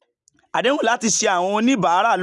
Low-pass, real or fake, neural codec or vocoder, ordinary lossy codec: 10.8 kHz; real; none; none